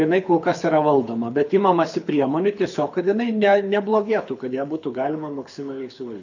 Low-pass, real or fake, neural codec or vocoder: 7.2 kHz; fake; codec, 24 kHz, 6 kbps, HILCodec